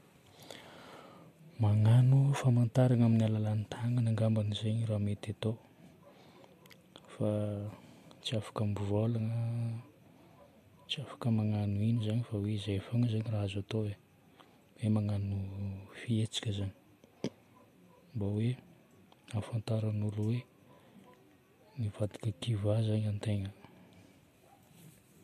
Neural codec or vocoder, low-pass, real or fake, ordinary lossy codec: none; 14.4 kHz; real; MP3, 64 kbps